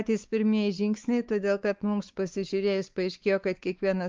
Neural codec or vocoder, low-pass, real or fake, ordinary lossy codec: codec, 16 kHz, 4 kbps, X-Codec, WavLM features, trained on Multilingual LibriSpeech; 7.2 kHz; fake; Opus, 24 kbps